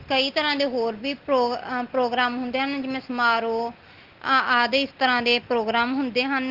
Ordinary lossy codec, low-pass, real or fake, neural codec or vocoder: Opus, 16 kbps; 5.4 kHz; real; none